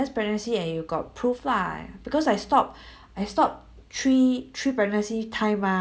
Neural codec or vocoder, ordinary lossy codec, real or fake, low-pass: none; none; real; none